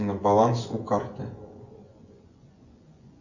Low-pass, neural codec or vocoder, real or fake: 7.2 kHz; vocoder, 24 kHz, 100 mel bands, Vocos; fake